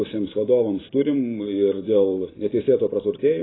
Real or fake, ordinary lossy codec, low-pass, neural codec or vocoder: fake; AAC, 16 kbps; 7.2 kHz; vocoder, 44.1 kHz, 128 mel bands every 512 samples, BigVGAN v2